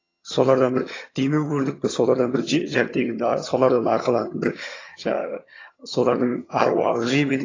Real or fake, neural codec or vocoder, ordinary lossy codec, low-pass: fake; vocoder, 22.05 kHz, 80 mel bands, HiFi-GAN; AAC, 32 kbps; 7.2 kHz